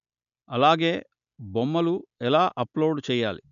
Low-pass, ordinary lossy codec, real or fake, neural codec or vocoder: 7.2 kHz; none; real; none